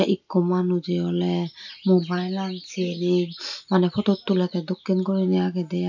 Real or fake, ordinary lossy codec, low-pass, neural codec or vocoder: real; none; 7.2 kHz; none